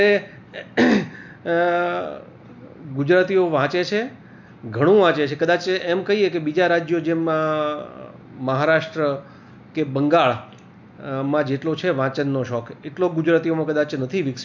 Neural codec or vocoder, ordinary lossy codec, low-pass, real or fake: none; none; 7.2 kHz; real